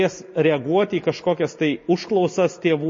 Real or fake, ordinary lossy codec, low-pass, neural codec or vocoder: real; MP3, 32 kbps; 7.2 kHz; none